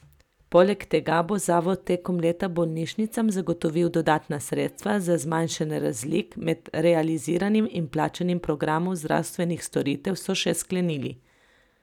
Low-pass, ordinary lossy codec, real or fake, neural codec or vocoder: 19.8 kHz; none; real; none